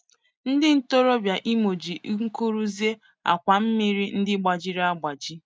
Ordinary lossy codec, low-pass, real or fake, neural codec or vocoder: none; none; real; none